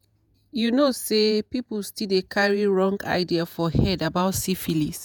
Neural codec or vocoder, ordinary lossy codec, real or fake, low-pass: vocoder, 48 kHz, 128 mel bands, Vocos; none; fake; none